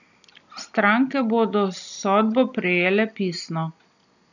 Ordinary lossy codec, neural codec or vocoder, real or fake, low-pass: none; none; real; 7.2 kHz